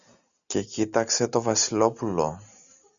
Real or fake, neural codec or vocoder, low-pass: real; none; 7.2 kHz